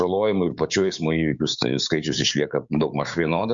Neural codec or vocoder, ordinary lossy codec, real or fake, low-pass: codec, 16 kHz, 6 kbps, DAC; Opus, 64 kbps; fake; 7.2 kHz